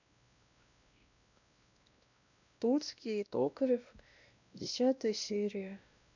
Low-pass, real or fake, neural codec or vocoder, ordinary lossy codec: 7.2 kHz; fake; codec, 16 kHz, 1 kbps, X-Codec, WavLM features, trained on Multilingual LibriSpeech; none